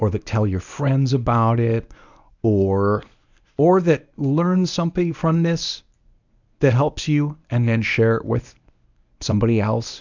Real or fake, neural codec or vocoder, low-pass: fake; codec, 24 kHz, 0.9 kbps, WavTokenizer, medium speech release version 1; 7.2 kHz